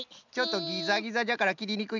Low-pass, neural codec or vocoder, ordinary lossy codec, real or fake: 7.2 kHz; none; none; real